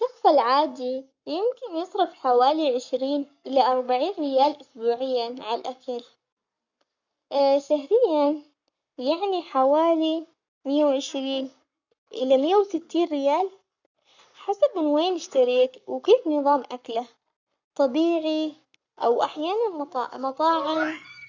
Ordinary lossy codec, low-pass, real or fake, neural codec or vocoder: none; 7.2 kHz; fake; codec, 44.1 kHz, 7.8 kbps, Pupu-Codec